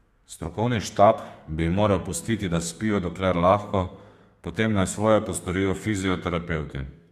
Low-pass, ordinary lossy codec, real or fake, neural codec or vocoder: 14.4 kHz; Opus, 64 kbps; fake; codec, 32 kHz, 1.9 kbps, SNAC